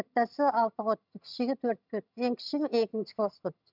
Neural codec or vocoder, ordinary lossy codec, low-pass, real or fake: vocoder, 22.05 kHz, 80 mel bands, HiFi-GAN; none; 5.4 kHz; fake